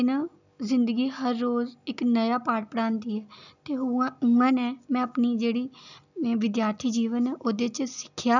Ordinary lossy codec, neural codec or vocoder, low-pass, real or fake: none; none; 7.2 kHz; real